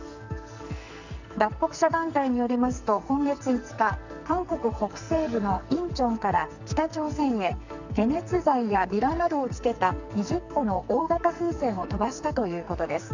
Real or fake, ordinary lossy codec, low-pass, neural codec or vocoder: fake; none; 7.2 kHz; codec, 44.1 kHz, 2.6 kbps, SNAC